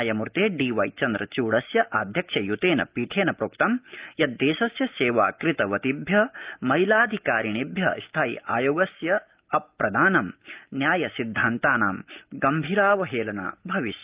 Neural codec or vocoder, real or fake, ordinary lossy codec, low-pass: none; real; Opus, 32 kbps; 3.6 kHz